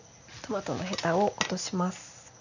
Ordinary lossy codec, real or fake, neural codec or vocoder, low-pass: none; real; none; 7.2 kHz